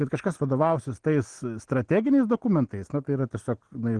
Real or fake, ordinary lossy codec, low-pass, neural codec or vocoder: real; Opus, 16 kbps; 9.9 kHz; none